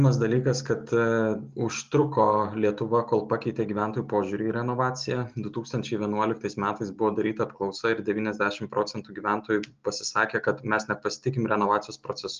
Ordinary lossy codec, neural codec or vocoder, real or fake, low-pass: Opus, 24 kbps; none; real; 7.2 kHz